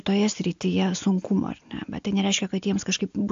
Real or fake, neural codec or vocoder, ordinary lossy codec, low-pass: real; none; AAC, 64 kbps; 7.2 kHz